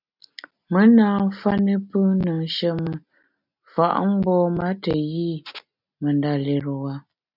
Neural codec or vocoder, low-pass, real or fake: none; 5.4 kHz; real